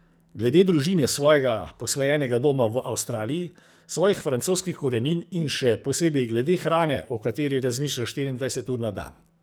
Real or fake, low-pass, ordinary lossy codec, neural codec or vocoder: fake; none; none; codec, 44.1 kHz, 2.6 kbps, SNAC